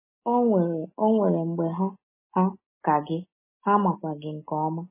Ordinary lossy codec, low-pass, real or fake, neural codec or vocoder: MP3, 16 kbps; 3.6 kHz; real; none